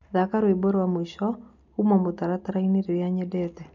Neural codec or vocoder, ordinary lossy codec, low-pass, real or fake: none; none; 7.2 kHz; real